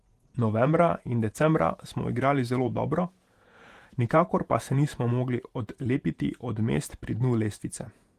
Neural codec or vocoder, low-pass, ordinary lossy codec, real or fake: vocoder, 48 kHz, 128 mel bands, Vocos; 14.4 kHz; Opus, 24 kbps; fake